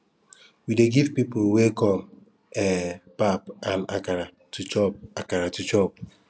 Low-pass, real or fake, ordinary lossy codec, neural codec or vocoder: none; real; none; none